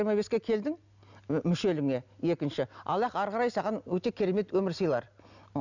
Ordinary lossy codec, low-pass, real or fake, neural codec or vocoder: none; 7.2 kHz; real; none